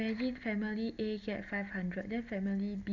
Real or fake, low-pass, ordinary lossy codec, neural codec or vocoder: real; 7.2 kHz; Opus, 64 kbps; none